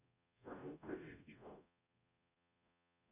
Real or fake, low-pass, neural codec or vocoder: fake; 3.6 kHz; codec, 44.1 kHz, 0.9 kbps, DAC